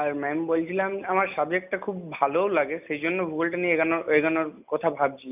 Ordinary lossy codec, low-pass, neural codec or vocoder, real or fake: none; 3.6 kHz; none; real